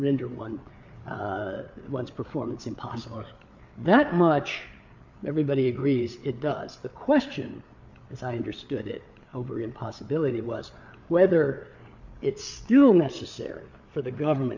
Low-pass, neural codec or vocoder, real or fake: 7.2 kHz; codec, 16 kHz, 8 kbps, FreqCodec, larger model; fake